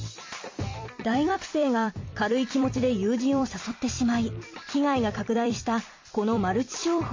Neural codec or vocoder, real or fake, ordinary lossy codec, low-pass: vocoder, 44.1 kHz, 128 mel bands every 256 samples, BigVGAN v2; fake; MP3, 32 kbps; 7.2 kHz